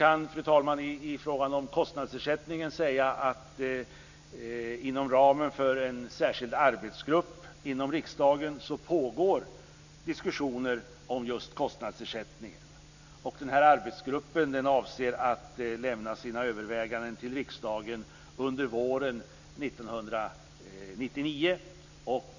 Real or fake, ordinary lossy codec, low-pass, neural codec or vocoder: real; none; 7.2 kHz; none